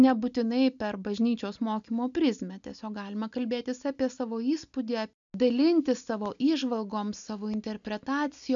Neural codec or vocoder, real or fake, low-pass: none; real; 7.2 kHz